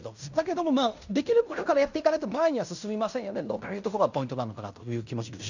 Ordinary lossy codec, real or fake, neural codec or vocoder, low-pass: none; fake; codec, 16 kHz in and 24 kHz out, 0.9 kbps, LongCat-Audio-Codec, fine tuned four codebook decoder; 7.2 kHz